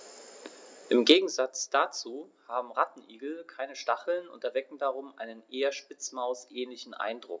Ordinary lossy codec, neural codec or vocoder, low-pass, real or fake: none; none; none; real